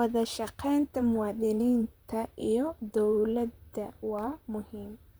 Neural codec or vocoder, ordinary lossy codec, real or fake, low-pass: vocoder, 44.1 kHz, 128 mel bands, Pupu-Vocoder; none; fake; none